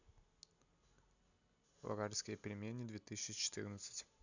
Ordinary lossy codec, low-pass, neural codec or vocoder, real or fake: none; 7.2 kHz; none; real